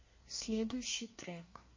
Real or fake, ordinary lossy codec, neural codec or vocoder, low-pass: fake; MP3, 32 kbps; codec, 24 kHz, 1 kbps, SNAC; 7.2 kHz